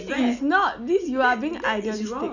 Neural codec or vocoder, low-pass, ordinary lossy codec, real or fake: none; 7.2 kHz; none; real